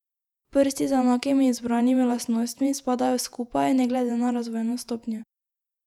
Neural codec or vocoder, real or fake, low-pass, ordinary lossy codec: vocoder, 48 kHz, 128 mel bands, Vocos; fake; 19.8 kHz; none